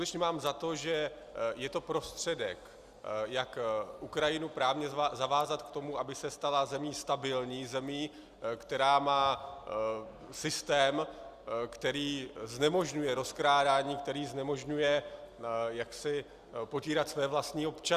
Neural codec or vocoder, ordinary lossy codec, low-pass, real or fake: none; Opus, 64 kbps; 14.4 kHz; real